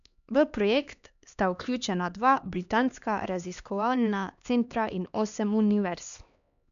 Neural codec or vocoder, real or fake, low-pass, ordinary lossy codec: codec, 16 kHz, 2 kbps, X-Codec, HuBERT features, trained on LibriSpeech; fake; 7.2 kHz; MP3, 96 kbps